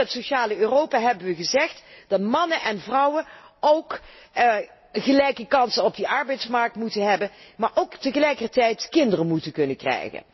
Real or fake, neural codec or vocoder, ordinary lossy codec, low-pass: real; none; MP3, 24 kbps; 7.2 kHz